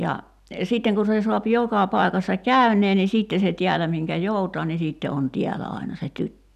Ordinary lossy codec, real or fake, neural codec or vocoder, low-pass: none; real; none; 14.4 kHz